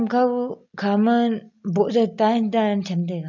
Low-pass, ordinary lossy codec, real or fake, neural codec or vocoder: 7.2 kHz; none; real; none